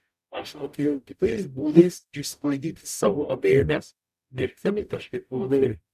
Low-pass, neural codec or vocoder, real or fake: 14.4 kHz; codec, 44.1 kHz, 0.9 kbps, DAC; fake